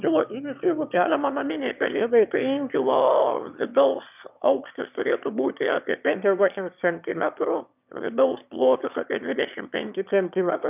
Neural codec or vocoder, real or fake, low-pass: autoencoder, 22.05 kHz, a latent of 192 numbers a frame, VITS, trained on one speaker; fake; 3.6 kHz